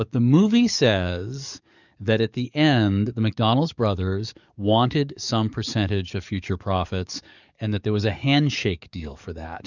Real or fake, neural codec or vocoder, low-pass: fake; codec, 44.1 kHz, 7.8 kbps, DAC; 7.2 kHz